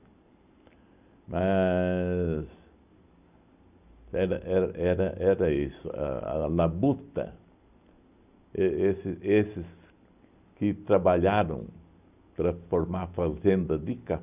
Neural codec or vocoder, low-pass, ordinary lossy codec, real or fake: none; 3.6 kHz; none; real